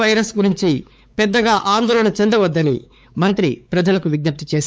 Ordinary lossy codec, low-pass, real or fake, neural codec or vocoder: none; none; fake; codec, 16 kHz, 4 kbps, X-Codec, WavLM features, trained on Multilingual LibriSpeech